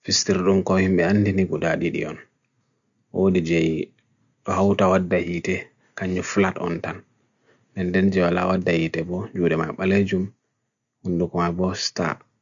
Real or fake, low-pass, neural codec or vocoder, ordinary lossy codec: real; 7.2 kHz; none; none